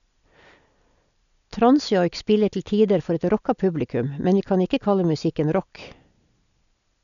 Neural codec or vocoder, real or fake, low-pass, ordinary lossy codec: none; real; 7.2 kHz; none